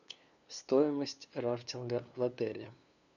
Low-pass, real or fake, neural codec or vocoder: 7.2 kHz; fake; codec, 16 kHz, 2 kbps, FunCodec, trained on LibriTTS, 25 frames a second